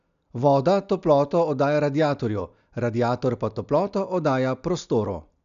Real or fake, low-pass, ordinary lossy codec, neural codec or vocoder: real; 7.2 kHz; none; none